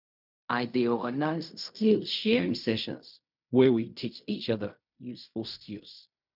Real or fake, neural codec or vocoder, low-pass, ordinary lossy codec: fake; codec, 16 kHz in and 24 kHz out, 0.4 kbps, LongCat-Audio-Codec, fine tuned four codebook decoder; 5.4 kHz; none